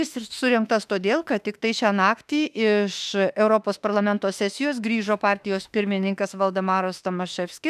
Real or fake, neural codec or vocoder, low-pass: fake; autoencoder, 48 kHz, 32 numbers a frame, DAC-VAE, trained on Japanese speech; 14.4 kHz